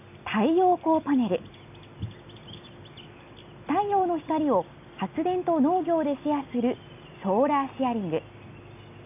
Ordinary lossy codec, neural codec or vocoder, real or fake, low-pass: none; none; real; 3.6 kHz